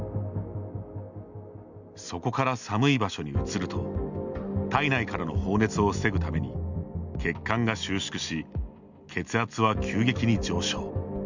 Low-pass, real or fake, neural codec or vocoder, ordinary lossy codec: 7.2 kHz; real; none; none